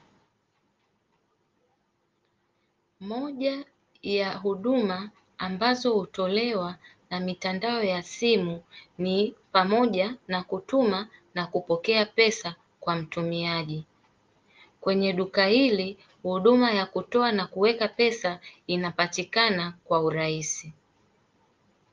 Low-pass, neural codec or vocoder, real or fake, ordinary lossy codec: 7.2 kHz; none; real; Opus, 32 kbps